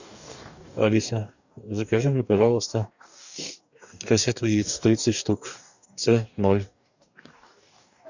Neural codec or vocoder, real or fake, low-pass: codec, 44.1 kHz, 2.6 kbps, DAC; fake; 7.2 kHz